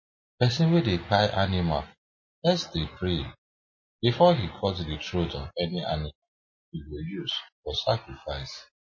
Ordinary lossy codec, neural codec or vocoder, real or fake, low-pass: MP3, 32 kbps; none; real; 7.2 kHz